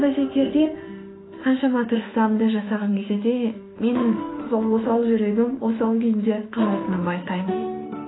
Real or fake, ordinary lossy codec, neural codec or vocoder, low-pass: fake; AAC, 16 kbps; autoencoder, 48 kHz, 32 numbers a frame, DAC-VAE, trained on Japanese speech; 7.2 kHz